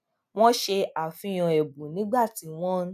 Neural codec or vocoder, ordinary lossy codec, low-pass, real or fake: none; none; 14.4 kHz; real